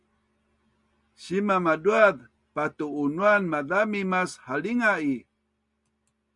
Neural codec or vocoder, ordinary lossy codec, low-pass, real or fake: none; MP3, 96 kbps; 10.8 kHz; real